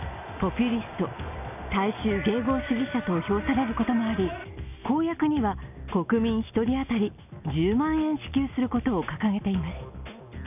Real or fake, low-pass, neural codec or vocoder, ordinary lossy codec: real; 3.6 kHz; none; none